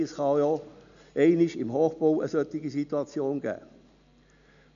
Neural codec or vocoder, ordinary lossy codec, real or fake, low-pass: none; none; real; 7.2 kHz